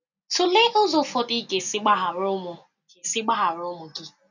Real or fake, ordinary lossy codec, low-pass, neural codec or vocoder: real; none; 7.2 kHz; none